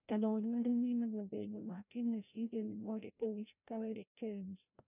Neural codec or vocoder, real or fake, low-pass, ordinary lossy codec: codec, 16 kHz, 0.5 kbps, FreqCodec, larger model; fake; 3.6 kHz; none